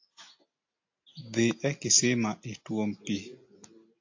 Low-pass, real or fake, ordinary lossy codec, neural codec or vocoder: 7.2 kHz; real; AAC, 48 kbps; none